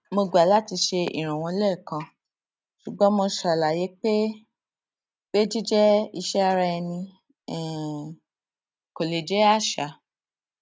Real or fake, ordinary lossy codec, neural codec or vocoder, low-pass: real; none; none; none